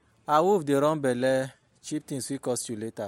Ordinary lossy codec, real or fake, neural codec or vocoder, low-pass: MP3, 64 kbps; real; none; 19.8 kHz